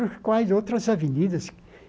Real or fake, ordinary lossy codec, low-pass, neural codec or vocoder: real; none; none; none